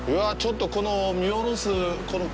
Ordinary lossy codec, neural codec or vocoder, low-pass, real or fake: none; none; none; real